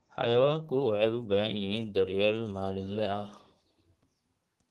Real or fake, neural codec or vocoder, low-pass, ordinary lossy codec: fake; codec, 32 kHz, 1.9 kbps, SNAC; 14.4 kHz; Opus, 24 kbps